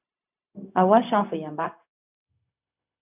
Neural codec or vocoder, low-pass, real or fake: codec, 16 kHz, 0.4 kbps, LongCat-Audio-Codec; 3.6 kHz; fake